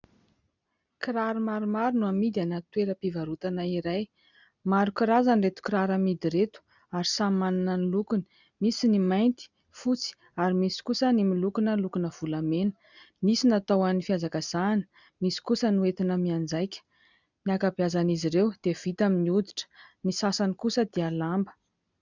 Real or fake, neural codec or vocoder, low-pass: real; none; 7.2 kHz